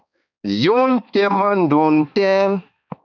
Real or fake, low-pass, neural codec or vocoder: fake; 7.2 kHz; codec, 16 kHz, 2 kbps, X-Codec, HuBERT features, trained on balanced general audio